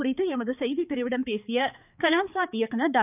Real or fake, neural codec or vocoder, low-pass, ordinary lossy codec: fake; codec, 16 kHz, 4 kbps, X-Codec, HuBERT features, trained on balanced general audio; 3.6 kHz; none